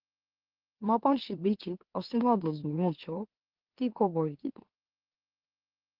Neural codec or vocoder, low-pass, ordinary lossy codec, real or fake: autoencoder, 44.1 kHz, a latent of 192 numbers a frame, MeloTTS; 5.4 kHz; Opus, 16 kbps; fake